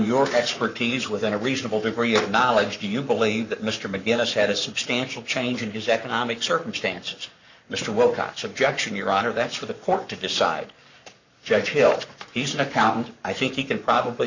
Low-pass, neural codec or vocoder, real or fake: 7.2 kHz; codec, 44.1 kHz, 7.8 kbps, Pupu-Codec; fake